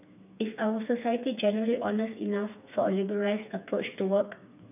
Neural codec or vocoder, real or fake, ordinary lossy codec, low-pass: codec, 16 kHz, 4 kbps, FreqCodec, smaller model; fake; none; 3.6 kHz